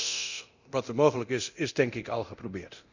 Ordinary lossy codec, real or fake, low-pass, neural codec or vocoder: Opus, 64 kbps; fake; 7.2 kHz; codec, 24 kHz, 0.9 kbps, DualCodec